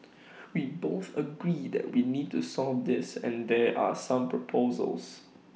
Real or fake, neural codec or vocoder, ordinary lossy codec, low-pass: real; none; none; none